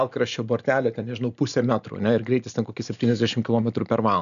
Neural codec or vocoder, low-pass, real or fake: none; 7.2 kHz; real